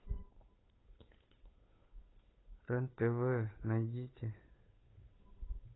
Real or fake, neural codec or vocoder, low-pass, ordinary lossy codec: fake; codec, 16 kHz, 8 kbps, FunCodec, trained on Chinese and English, 25 frames a second; 7.2 kHz; AAC, 16 kbps